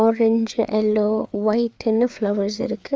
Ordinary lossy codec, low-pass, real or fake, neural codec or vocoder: none; none; fake; codec, 16 kHz, 4 kbps, FreqCodec, larger model